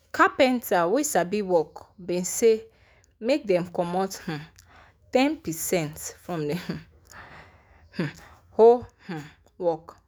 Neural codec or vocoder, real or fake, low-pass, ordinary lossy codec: autoencoder, 48 kHz, 128 numbers a frame, DAC-VAE, trained on Japanese speech; fake; none; none